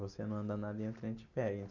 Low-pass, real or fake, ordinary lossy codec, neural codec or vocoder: 7.2 kHz; real; none; none